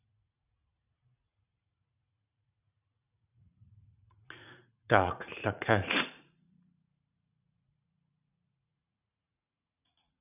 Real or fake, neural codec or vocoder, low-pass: fake; vocoder, 44.1 kHz, 80 mel bands, Vocos; 3.6 kHz